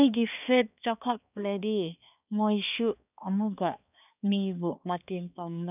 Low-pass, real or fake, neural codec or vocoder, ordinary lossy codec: 3.6 kHz; fake; codec, 16 kHz, 2 kbps, X-Codec, HuBERT features, trained on balanced general audio; none